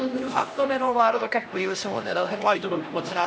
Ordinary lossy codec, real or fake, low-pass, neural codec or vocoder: none; fake; none; codec, 16 kHz, 1 kbps, X-Codec, HuBERT features, trained on LibriSpeech